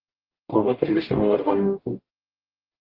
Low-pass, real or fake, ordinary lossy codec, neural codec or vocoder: 5.4 kHz; fake; Opus, 16 kbps; codec, 44.1 kHz, 0.9 kbps, DAC